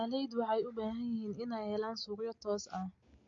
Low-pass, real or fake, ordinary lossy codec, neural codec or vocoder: 7.2 kHz; real; MP3, 48 kbps; none